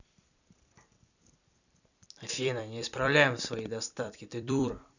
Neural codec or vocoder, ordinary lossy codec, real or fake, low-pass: vocoder, 44.1 kHz, 128 mel bands every 256 samples, BigVGAN v2; none; fake; 7.2 kHz